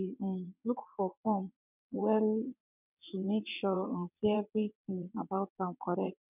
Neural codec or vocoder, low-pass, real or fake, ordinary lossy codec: vocoder, 22.05 kHz, 80 mel bands, WaveNeXt; 3.6 kHz; fake; none